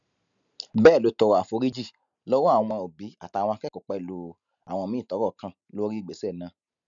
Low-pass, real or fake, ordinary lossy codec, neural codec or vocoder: 7.2 kHz; real; none; none